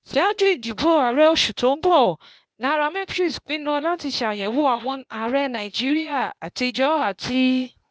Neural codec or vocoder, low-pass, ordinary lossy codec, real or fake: codec, 16 kHz, 0.8 kbps, ZipCodec; none; none; fake